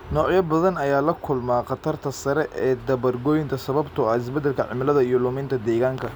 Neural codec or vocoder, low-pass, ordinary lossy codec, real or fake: none; none; none; real